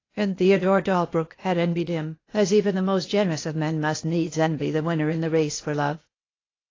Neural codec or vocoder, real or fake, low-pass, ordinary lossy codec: codec, 16 kHz, 0.8 kbps, ZipCodec; fake; 7.2 kHz; AAC, 32 kbps